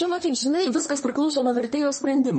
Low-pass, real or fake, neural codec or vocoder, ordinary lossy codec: 10.8 kHz; fake; codec, 24 kHz, 1 kbps, SNAC; MP3, 32 kbps